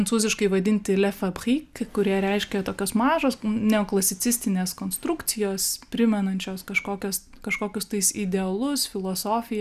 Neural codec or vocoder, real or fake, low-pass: none; real; 14.4 kHz